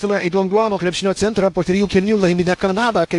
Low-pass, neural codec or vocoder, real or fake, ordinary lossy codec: 10.8 kHz; codec, 16 kHz in and 24 kHz out, 0.8 kbps, FocalCodec, streaming, 65536 codes; fake; AAC, 64 kbps